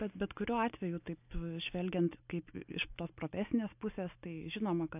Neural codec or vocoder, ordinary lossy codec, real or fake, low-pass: none; AAC, 32 kbps; real; 3.6 kHz